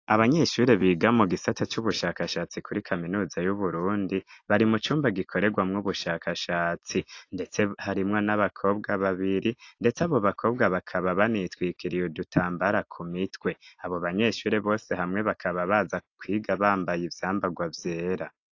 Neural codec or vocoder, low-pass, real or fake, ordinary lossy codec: none; 7.2 kHz; real; AAC, 48 kbps